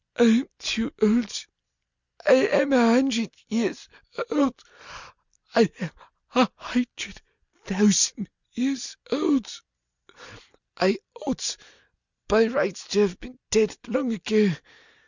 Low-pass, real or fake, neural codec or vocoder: 7.2 kHz; real; none